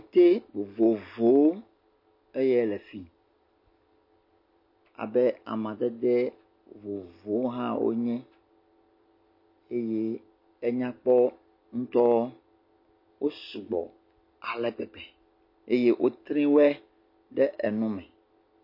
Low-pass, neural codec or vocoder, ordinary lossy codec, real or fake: 5.4 kHz; none; MP3, 32 kbps; real